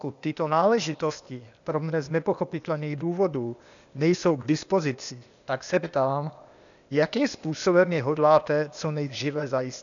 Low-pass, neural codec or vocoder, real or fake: 7.2 kHz; codec, 16 kHz, 0.8 kbps, ZipCodec; fake